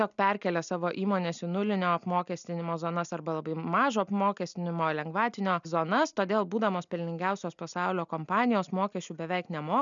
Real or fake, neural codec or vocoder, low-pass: real; none; 7.2 kHz